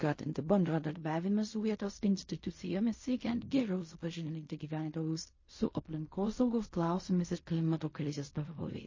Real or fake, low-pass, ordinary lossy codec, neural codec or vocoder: fake; 7.2 kHz; MP3, 32 kbps; codec, 16 kHz in and 24 kHz out, 0.4 kbps, LongCat-Audio-Codec, fine tuned four codebook decoder